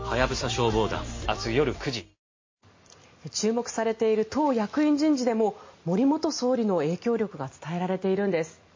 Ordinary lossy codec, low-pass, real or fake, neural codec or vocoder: MP3, 32 kbps; 7.2 kHz; real; none